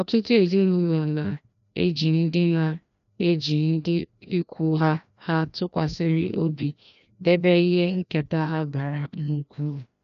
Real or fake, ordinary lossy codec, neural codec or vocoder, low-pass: fake; none; codec, 16 kHz, 1 kbps, FreqCodec, larger model; 7.2 kHz